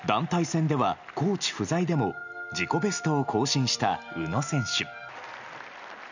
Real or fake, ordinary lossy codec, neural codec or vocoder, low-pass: real; none; none; 7.2 kHz